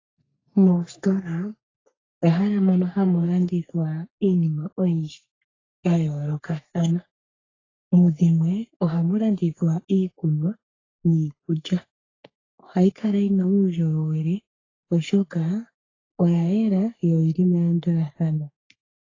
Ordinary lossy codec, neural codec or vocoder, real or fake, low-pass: AAC, 32 kbps; codec, 44.1 kHz, 3.4 kbps, Pupu-Codec; fake; 7.2 kHz